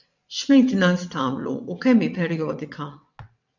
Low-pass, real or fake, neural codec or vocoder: 7.2 kHz; fake; vocoder, 22.05 kHz, 80 mel bands, WaveNeXt